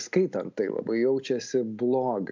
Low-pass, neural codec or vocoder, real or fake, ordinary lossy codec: 7.2 kHz; none; real; MP3, 64 kbps